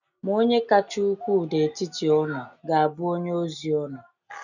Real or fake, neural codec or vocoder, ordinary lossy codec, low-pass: real; none; none; 7.2 kHz